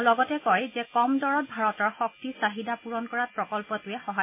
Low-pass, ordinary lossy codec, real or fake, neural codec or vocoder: 3.6 kHz; MP3, 24 kbps; real; none